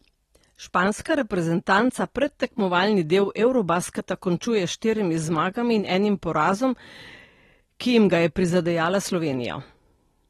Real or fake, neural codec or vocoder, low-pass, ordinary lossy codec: real; none; 19.8 kHz; AAC, 32 kbps